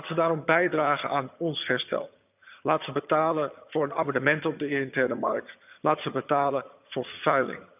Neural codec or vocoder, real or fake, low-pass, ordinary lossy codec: vocoder, 22.05 kHz, 80 mel bands, HiFi-GAN; fake; 3.6 kHz; none